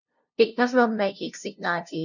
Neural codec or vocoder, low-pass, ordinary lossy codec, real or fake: codec, 16 kHz, 0.5 kbps, FunCodec, trained on LibriTTS, 25 frames a second; 7.2 kHz; none; fake